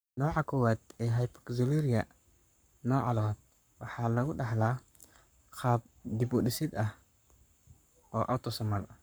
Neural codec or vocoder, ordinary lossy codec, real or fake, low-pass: codec, 44.1 kHz, 7.8 kbps, Pupu-Codec; none; fake; none